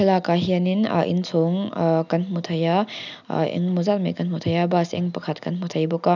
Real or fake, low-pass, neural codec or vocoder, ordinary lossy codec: real; 7.2 kHz; none; none